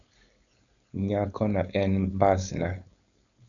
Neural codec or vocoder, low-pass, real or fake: codec, 16 kHz, 4.8 kbps, FACodec; 7.2 kHz; fake